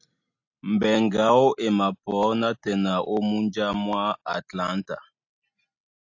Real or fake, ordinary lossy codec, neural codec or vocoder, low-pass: real; AAC, 48 kbps; none; 7.2 kHz